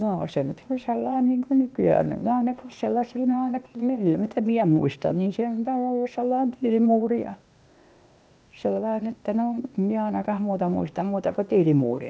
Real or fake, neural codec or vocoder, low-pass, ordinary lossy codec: fake; codec, 16 kHz, 0.8 kbps, ZipCodec; none; none